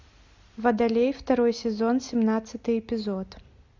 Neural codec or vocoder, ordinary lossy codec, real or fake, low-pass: none; MP3, 64 kbps; real; 7.2 kHz